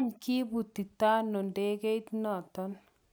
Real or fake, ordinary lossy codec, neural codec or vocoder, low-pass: real; none; none; none